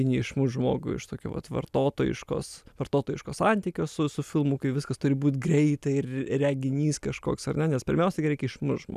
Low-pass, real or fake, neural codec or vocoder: 14.4 kHz; real; none